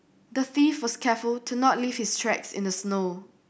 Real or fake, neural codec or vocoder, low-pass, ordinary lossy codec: real; none; none; none